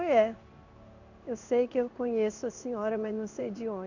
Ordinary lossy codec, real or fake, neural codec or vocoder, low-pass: none; fake; codec, 16 kHz in and 24 kHz out, 1 kbps, XY-Tokenizer; 7.2 kHz